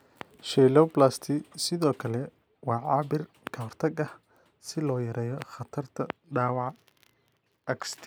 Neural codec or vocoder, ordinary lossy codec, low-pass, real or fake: none; none; none; real